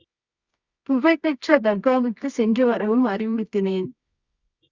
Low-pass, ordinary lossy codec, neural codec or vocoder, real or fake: 7.2 kHz; Opus, 64 kbps; codec, 24 kHz, 0.9 kbps, WavTokenizer, medium music audio release; fake